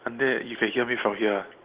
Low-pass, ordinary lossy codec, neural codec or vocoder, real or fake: 3.6 kHz; Opus, 16 kbps; none; real